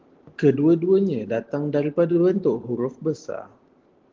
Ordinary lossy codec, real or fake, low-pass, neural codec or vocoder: Opus, 16 kbps; real; 7.2 kHz; none